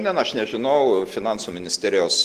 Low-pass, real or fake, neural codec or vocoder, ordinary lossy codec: 14.4 kHz; real; none; Opus, 16 kbps